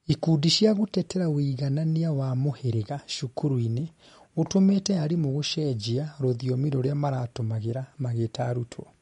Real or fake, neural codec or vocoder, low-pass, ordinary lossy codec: real; none; 19.8 kHz; MP3, 48 kbps